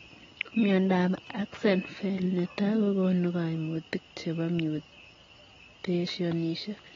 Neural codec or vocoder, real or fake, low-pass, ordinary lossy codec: none; real; 7.2 kHz; AAC, 24 kbps